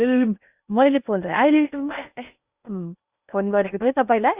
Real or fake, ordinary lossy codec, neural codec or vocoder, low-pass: fake; Opus, 64 kbps; codec, 16 kHz in and 24 kHz out, 0.6 kbps, FocalCodec, streaming, 2048 codes; 3.6 kHz